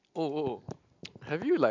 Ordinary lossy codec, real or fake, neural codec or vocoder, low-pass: MP3, 64 kbps; real; none; 7.2 kHz